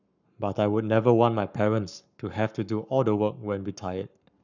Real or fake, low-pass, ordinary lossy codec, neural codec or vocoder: fake; 7.2 kHz; none; codec, 44.1 kHz, 7.8 kbps, Pupu-Codec